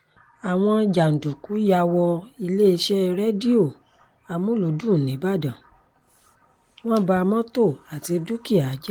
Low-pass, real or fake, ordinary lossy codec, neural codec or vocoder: 19.8 kHz; real; Opus, 24 kbps; none